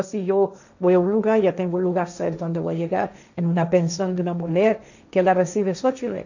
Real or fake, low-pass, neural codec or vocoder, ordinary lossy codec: fake; 7.2 kHz; codec, 16 kHz, 1.1 kbps, Voila-Tokenizer; none